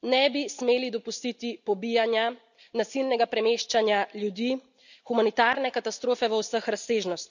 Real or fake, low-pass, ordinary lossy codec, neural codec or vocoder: real; 7.2 kHz; none; none